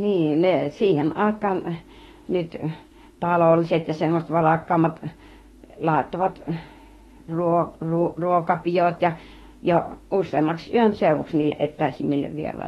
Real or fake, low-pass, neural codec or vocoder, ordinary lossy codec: fake; 19.8 kHz; autoencoder, 48 kHz, 32 numbers a frame, DAC-VAE, trained on Japanese speech; AAC, 32 kbps